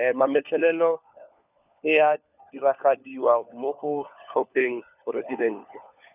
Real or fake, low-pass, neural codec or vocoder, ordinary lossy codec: fake; 3.6 kHz; codec, 16 kHz, 4 kbps, FunCodec, trained on LibriTTS, 50 frames a second; none